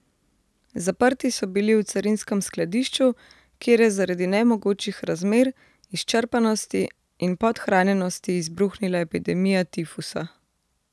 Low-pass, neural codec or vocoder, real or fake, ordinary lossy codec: none; none; real; none